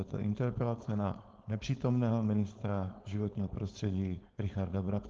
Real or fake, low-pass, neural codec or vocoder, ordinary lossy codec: fake; 7.2 kHz; codec, 16 kHz, 4.8 kbps, FACodec; Opus, 16 kbps